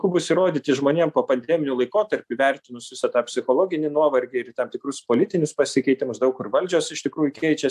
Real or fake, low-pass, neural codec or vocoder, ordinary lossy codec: real; 14.4 kHz; none; AAC, 96 kbps